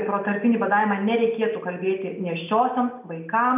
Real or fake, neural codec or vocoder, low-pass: real; none; 3.6 kHz